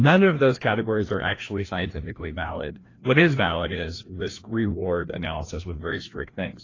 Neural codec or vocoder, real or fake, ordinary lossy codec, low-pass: codec, 16 kHz, 1 kbps, FreqCodec, larger model; fake; AAC, 32 kbps; 7.2 kHz